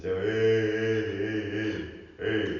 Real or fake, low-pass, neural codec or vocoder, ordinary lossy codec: real; 7.2 kHz; none; none